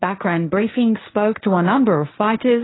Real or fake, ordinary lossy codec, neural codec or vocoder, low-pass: fake; AAC, 16 kbps; codec, 16 kHz, 1.1 kbps, Voila-Tokenizer; 7.2 kHz